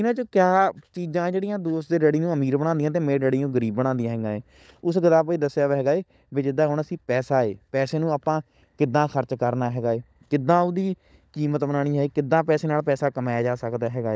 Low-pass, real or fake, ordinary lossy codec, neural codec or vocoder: none; fake; none; codec, 16 kHz, 16 kbps, FunCodec, trained on LibriTTS, 50 frames a second